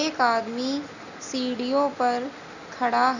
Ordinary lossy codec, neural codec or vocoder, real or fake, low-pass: Opus, 64 kbps; none; real; 7.2 kHz